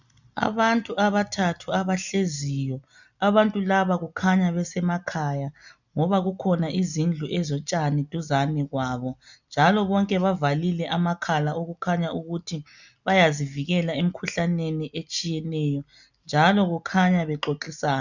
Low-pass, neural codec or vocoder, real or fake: 7.2 kHz; none; real